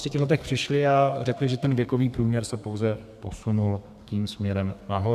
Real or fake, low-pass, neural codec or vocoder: fake; 14.4 kHz; codec, 32 kHz, 1.9 kbps, SNAC